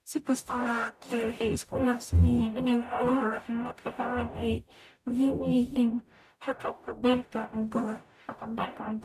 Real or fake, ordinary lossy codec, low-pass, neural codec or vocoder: fake; none; 14.4 kHz; codec, 44.1 kHz, 0.9 kbps, DAC